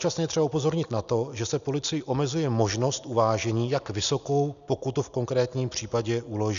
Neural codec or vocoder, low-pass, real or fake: none; 7.2 kHz; real